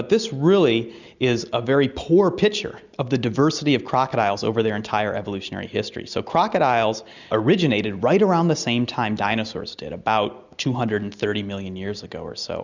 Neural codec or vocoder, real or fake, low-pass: none; real; 7.2 kHz